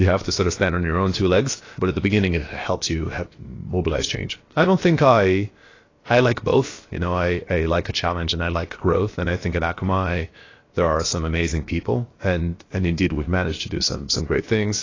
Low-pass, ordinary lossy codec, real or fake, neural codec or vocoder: 7.2 kHz; AAC, 32 kbps; fake; codec, 16 kHz, about 1 kbps, DyCAST, with the encoder's durations